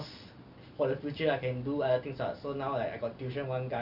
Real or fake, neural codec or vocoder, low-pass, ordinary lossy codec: real; none; 5.4 kHz; none